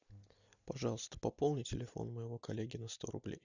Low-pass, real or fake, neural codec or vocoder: 7.2 kHz; real; none